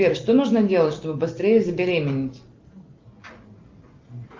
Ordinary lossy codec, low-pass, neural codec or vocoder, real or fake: Opus, 24 kbps; 7.2 kHz; none; real